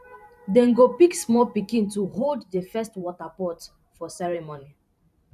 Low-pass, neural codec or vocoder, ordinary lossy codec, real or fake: 14.4 kHz; vocoder, 44.1 kHz, 128 mel bands every 512 samples, BigVGAN v2; none; fake